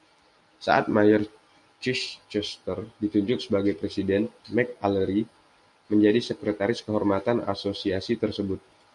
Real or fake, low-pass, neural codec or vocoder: real; 10.8 kHz; none